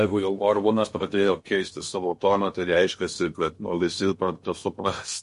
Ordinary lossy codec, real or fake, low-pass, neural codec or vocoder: MP3, 48 kbps; fake; 10.8 kHz; codec, 16 kHz in and 24 kHz out, 0.8 kbps, FocalCodec, streaming, 65536 codes